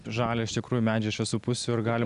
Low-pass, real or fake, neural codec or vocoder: 10.8 kHz; real; none